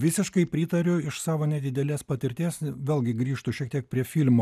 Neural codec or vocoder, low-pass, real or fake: none; 14.4 kHz; real